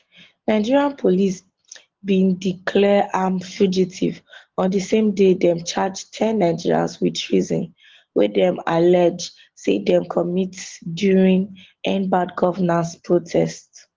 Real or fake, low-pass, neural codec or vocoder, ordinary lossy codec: real; 7.2 kHz; none; Opus, 16 kbps